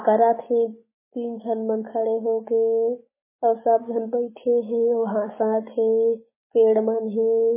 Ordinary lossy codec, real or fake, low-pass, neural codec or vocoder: MP3, 16 kbps; real; 3.6 kHz; none